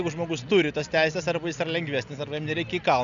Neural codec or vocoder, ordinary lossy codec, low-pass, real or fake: none; MP3, 96 kbps; 7.2 kHz; real